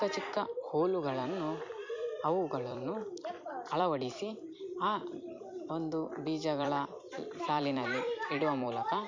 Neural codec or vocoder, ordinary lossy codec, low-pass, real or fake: vocoder, 44.1 kHz, 128 mel bands every 256 samples, BigVGAN v2; MP3, 48 kbps; 7.2 kHz; fake